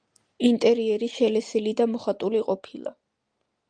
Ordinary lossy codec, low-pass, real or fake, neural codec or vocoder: Opus, 32 kbps; 9.9 kHz; real; none